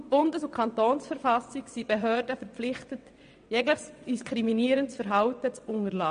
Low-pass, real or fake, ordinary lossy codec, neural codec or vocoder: 9.9 kHz; fake; MP3, 48 kbps; vocoder, 22.05 kHz, 80 mel bands, WaveNeXt